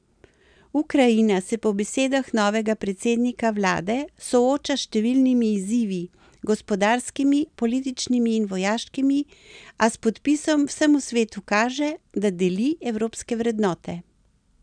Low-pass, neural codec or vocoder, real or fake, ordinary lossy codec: 9.9 kHz; none; real; none